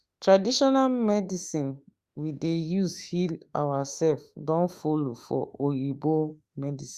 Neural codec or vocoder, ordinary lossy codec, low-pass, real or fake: autoencoder, 48 kHz, 32 numbers a frame, DAC-VAE, trained on Japanese speech; Opus, 64 kbps; 14.4 kHz; fake